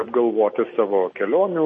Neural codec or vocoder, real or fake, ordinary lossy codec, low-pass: none; real; MP3, 32 kbps; 10.8 kHz